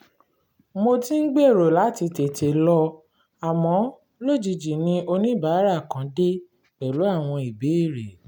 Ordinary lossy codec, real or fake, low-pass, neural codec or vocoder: none; real; 19.8 kHz; none